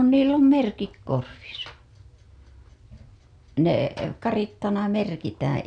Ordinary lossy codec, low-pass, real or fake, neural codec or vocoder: none; 9.9 kHz; real; none